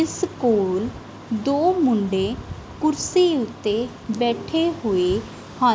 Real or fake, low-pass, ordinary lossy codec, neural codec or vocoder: real; none; none; none